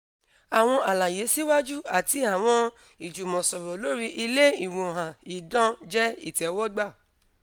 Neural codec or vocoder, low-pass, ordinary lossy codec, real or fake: none; none; none; real